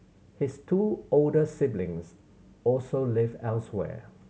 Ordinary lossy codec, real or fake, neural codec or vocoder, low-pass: none; real; none; none